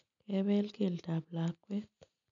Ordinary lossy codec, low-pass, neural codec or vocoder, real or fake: none; 7.2 kHz; none; real